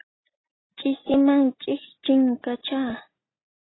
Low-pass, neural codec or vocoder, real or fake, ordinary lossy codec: 7.2 kHz; none; real; AAC, 16 kbps